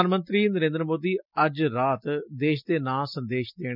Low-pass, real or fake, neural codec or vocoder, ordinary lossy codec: 5.4 kHz; real; none; none